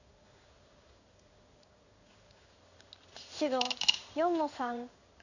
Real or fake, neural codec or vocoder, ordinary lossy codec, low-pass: fake; codec, 16 kHz in and 24 kHz out, 1 kbps, XY-Tokenizer; none; 7.2 kHz